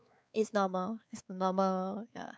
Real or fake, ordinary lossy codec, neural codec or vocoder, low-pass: fake; none; codec, 16 kHz, 4 kbps, X-Codec, WavLM features, trained on Multilingual LibriSpeech; none